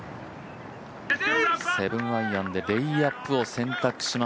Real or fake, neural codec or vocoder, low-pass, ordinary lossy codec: real; none; none; none